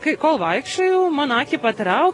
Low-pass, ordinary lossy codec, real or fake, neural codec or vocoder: 10.8 kHz; AAC, 32 kbps; real; none